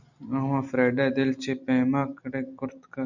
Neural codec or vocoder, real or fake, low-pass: none; real; 7.2 kHz